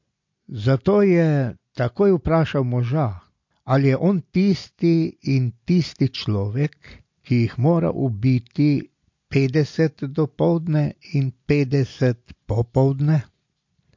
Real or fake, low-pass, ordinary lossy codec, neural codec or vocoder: real; 7.2 kHz; MP3, 48 kbps; none